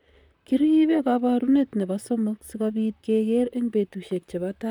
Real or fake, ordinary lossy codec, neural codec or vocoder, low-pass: fake; none; vocoder, 44.1 kHz, 128 mel bands, Pupu-Vocoder; 19.8 kHz